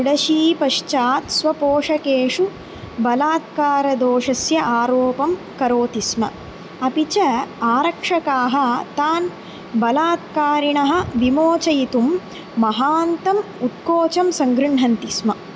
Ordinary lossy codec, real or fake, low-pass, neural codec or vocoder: none; real; none; none